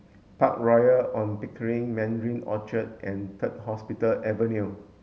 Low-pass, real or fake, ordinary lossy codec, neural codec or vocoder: none; real; none; none